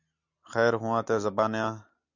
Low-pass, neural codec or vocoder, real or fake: 7.2 kHz; none; real